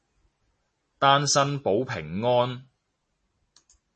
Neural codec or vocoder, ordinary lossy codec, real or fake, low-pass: none; MP3, 32 kbps; real; 10.8 kHz